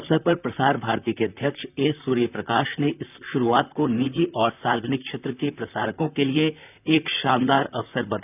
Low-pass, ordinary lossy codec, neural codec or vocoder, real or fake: 3.6 kHz; none; vocoder, 44.1 kHz, 128 mel bands, Pupu-Vocoder; fake